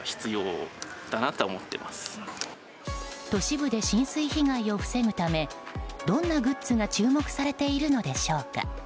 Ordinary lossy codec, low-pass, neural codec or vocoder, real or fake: none; none; none; real